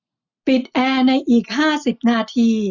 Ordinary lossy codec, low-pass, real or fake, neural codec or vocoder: none; 7.2 kHz; real; none